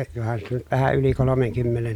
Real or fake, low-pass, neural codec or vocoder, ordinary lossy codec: real; 19.8 kHz; none; none